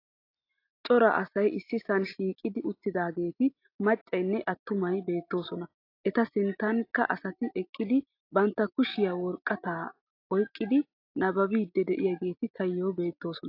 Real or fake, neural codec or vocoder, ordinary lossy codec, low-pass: real; none; AAC, 32 kbps; 5.4 kHz